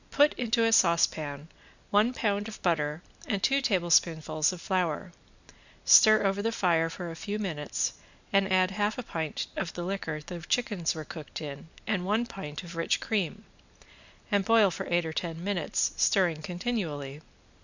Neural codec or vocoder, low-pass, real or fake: none; 7.2 kHz; real